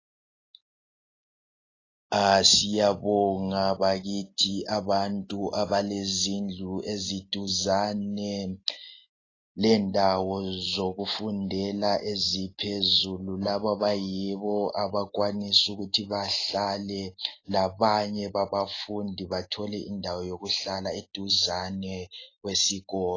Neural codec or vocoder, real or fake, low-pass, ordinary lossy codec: none; real; 7.2 kHz; AAC, 32 kbps